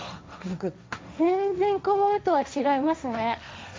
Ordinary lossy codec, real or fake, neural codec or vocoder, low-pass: none; fake; codec, 16 kHz, 1.1 kbps, Voila-Tokenizer; none